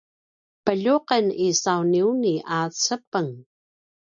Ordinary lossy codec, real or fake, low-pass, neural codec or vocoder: MP3, 96 kbps; real; 7.2 kHz; none